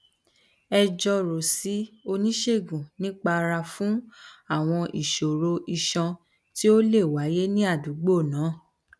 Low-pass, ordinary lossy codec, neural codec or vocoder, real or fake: none; none; none; real